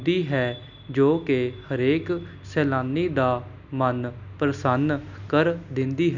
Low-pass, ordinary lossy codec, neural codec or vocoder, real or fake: 7.2 kHz; none; none; real